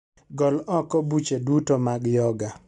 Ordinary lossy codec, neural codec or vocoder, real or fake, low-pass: MP3, 96 kbps; none; real; 10.8 kHz